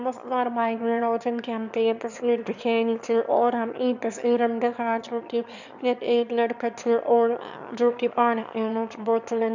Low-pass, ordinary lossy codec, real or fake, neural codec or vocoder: 7.2 kHz; none; fake; autoencoder, 22.05 kHz, a latent of 192 numbers a frame, VITS, trained on one speaker